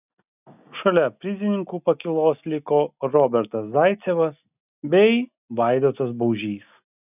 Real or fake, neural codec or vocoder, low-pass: real; none; 3.6 kHz